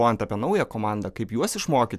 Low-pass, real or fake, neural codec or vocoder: 14.4 kHz; real; none